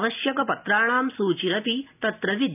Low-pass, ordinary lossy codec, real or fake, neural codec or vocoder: 3.6 kHz; none; real; none